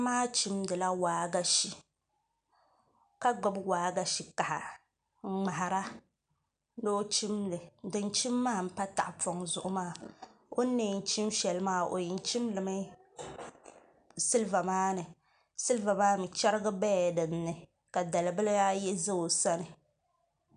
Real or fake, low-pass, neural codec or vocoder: real; 9.9 kHz; none